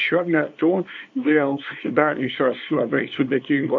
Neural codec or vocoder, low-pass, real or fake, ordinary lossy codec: codec, 24 kHz, 0.9 kbps, WavTokenizer, small release; 7.2 kHz; fake; MP3, 48 kbps